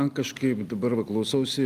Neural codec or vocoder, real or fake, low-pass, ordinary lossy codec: none; real; 14.4 kHz; Opus, 32 kbps